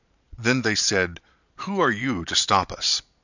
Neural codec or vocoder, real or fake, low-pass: vocoder, 22.05 kHz, 80 mel bands, Vocos; fake; 7.2 kHz